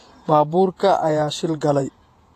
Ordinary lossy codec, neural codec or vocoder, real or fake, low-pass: AAC, 48 kbps; vocoder, 48 kHz, 128 mel bands, Vocos; fake; 14.4 kHz